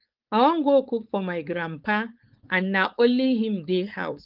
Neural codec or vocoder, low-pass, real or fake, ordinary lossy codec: codec, 16 kHz, 4.8 kbps, FACodec; 5.4 kHz; fake; Opus, 32 kbps